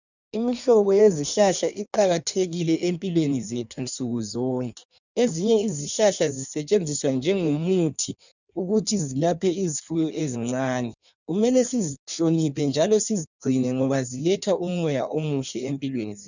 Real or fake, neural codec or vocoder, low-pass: fake; codec, 16 kHz in and 24 kHz out, 1.1 kbps, FireRedTTS-2 codec; 7.2 kHz